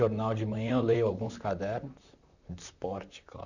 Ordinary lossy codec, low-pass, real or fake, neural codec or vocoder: none; 7.2 kHz; fake; vocoder, 44.1 kHz, 128 mel bands, Pupu-Vocoder